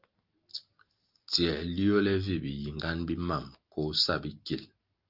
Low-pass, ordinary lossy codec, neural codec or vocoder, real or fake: 5.4 kHz; Opus, 32 kbps; none; real